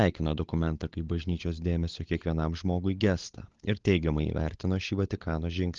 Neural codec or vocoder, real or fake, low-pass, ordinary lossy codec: codec, 16 kHz, 16 kbps, FunCodec, trained on LibriTTS, 50 frames a second; fake; 7.2 kHz; Opus, 16 kbps